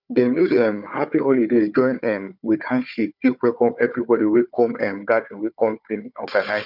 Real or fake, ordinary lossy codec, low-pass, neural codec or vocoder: fake; none; 5.4 kHz; codec, 16 kHz, 4 kbps, FunCodec, trained on Chinese and English, 50 frames a second